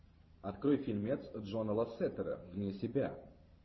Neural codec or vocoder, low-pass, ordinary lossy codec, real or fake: vocoder, 44.1 kHz, 128 mel bands every 512 samples, BigVGAN v2; 7.2 kHz; MP3, 24 kbps; fake